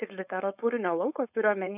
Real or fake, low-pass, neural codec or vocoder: fake; 3.6 kHz; codec, 16 kHz, 4.8 kbps, FACodec